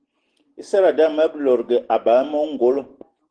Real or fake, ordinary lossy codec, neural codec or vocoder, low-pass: real; Opus, 16 kbps; none; 9.9 kHz